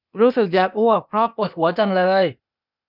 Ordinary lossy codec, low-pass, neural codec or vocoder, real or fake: none; 5.4 kHz; codec, 16 kHz, 0.8 kbps, ZipCodec; fake